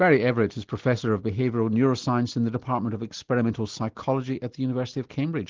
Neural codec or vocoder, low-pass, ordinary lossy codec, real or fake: none; 7.2 kHz; Opus, 16 kbps; real